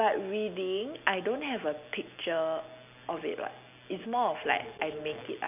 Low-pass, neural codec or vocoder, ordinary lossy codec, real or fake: 3.6 kHz; none; none; real